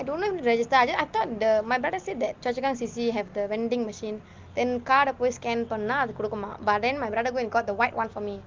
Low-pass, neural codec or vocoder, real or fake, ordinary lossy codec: 7.2 kHz; none; real; Opus, 32 kbps